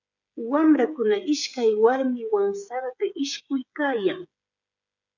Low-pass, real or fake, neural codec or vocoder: 7.2 kHz; fake; codec, 16 kHz, 8 kbps, FreqCodec, smaller model